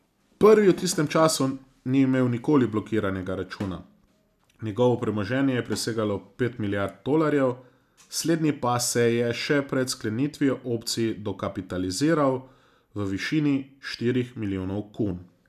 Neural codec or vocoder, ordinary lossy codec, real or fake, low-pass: none; none; real; 14.4 kHz